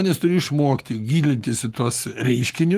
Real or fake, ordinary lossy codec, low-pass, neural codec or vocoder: fake; Opus, 24 kbps; 14.4 kHz; codec, 44.1 kHz, 7.8 kbps, Pupu-Codec